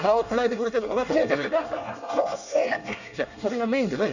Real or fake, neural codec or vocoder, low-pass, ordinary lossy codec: fake; codec, 24 kHz, 1 kbps, SNAC; 7.2 kHz; none